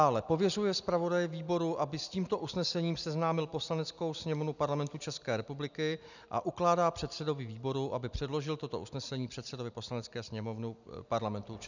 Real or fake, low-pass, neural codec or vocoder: real; 7.2 kHz; none